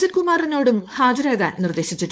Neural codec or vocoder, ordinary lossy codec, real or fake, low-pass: codec, 16 kHz, 4.8 kbps, FACodec; none; fake; none